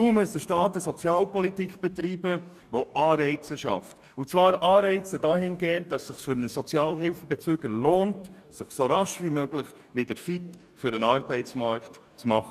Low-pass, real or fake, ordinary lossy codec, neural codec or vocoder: 14.4 kHz; fake; none; codec, 44.1 kHz, 2.6 kbps, DAC